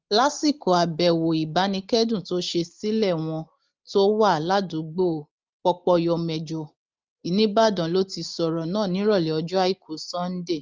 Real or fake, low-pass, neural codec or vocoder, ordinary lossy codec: real; 7.2 kHz; none; Opus, 32 kbps